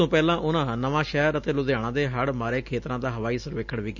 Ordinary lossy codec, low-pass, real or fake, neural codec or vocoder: none; 7.2 kHz; real; none